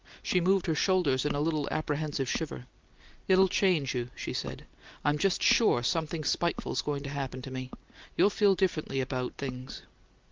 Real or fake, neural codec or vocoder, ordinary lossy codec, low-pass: real; none; Opus, 32 kbps; 7.2 kHz